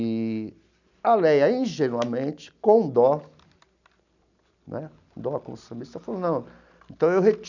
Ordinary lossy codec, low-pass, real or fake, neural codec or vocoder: none; 7.2 kHz; real; none